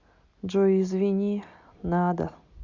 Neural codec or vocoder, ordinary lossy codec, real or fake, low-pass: none; Opus, 64 kbps; real; 7.2 kHz